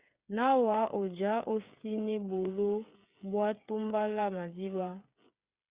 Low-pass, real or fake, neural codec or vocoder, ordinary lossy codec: 3.6 kHz; fake; codec, 16 kHz, 8 kbps, FreqCodec, smaller model; Opus, 64 kbps